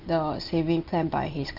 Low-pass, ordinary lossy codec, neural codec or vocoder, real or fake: 5.4 kHz; Opus, 32 kbps; none; real